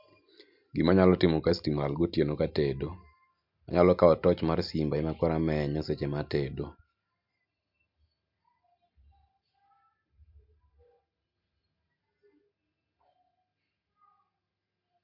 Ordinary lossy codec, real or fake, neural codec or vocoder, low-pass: MP3, 48 kbps; real; none; 5.4 kHz